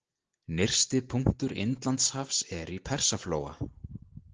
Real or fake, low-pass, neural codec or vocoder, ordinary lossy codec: real; 7.2 kHz; none; Opus, 16 kbps